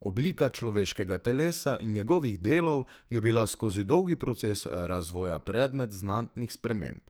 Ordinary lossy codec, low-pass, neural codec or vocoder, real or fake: none; none; codec, 44.1 kHz, 2.6 kbps, SNAC; fake